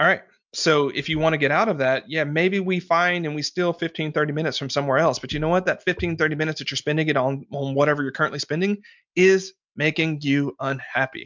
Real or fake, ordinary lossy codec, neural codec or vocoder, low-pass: real; MP3, 64 kbps; none; 7.2 kHz